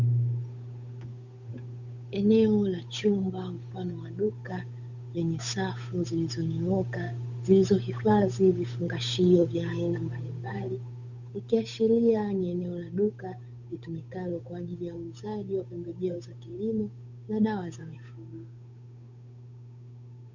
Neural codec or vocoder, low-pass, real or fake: codec, 16 kHz, 8 kbps, FunCodec, trained on Chinese and English, 25 frames a second; 7.2 kHz; fake